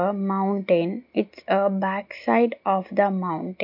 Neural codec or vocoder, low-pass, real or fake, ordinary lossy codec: none; 5.4 kHz; real; MP3, 48 kbps